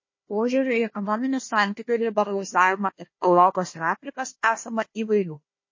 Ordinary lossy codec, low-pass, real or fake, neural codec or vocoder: MP3, 32 kbps; 7.2 kHz; fake; codec, 16 kHz, 1 kbps, FunCodec, trained on Chinese and English, 50 frames a second